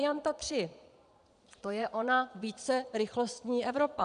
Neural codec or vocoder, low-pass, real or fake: vocoder, 22.05 kHz, 80 mel bands, Vocos; 9.9 kHz; fake